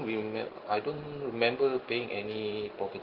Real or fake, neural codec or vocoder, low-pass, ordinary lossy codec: real; none; 5.4 kHz; Opus, 16 kbps